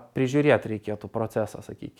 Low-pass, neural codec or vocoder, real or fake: 19.8 kHz; none; real